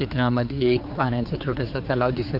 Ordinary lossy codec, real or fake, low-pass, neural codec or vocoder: none; fake; 5.4 kHz; codec, 16 kHz, 4 kbps, X-Codec, HuBERT features, trained on general audio